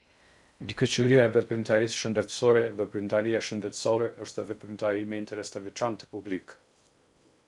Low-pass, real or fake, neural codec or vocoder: 10.8 kHz; fake; codec, 16 kHz in and 24 kHz out, 0.6 kbps, FocalCodec, streaming, 2048 codes